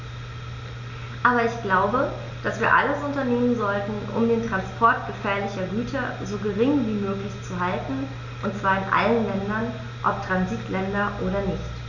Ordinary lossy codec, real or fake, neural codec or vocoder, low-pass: none; real; none; 7.2 kHz